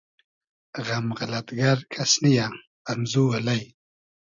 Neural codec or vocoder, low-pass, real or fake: none; 7.2 kHz; real